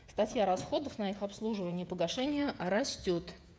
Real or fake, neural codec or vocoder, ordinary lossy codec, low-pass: fake; codec, 16 kHz, 16 kbps, FreqCodec, smaller model; none; none